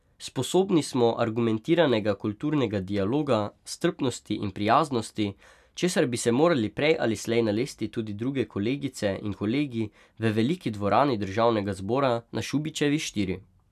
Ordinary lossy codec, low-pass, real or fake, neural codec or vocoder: none; 14.4 kHz; real; none